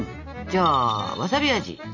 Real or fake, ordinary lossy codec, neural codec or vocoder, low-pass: real; none; none; 7.2 kHz